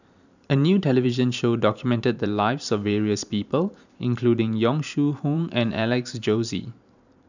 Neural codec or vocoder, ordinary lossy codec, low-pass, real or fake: vocoder, 44.1 kHz, 128 mel bands every 512 samples, BigVGAN v2; none; 7.2 kHz; fake